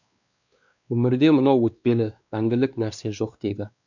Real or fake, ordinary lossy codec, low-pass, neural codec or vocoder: fake; none; 7.2 kHz; codec, 16 kHz, 2 kbps, X-Codec, WavLM features, trained on Multilingual LibriSpeech